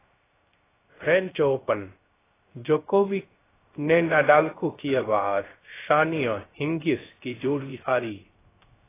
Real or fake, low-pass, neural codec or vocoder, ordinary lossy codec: fake; 3.6 kHz; codec, 16 kHz, 0.3 kbps, FocalCodec; AAC, 16 kbps